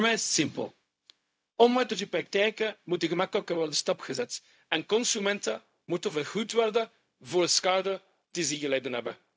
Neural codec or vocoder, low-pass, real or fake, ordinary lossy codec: codec, 16 kHz, 0.4 kbps, LongCat-Audio-Codec; none; fake; none